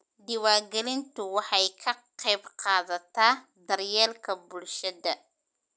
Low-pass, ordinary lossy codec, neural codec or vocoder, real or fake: none; none; none; real